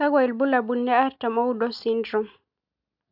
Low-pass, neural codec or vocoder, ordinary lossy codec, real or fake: 5.4 kHz; none; none; real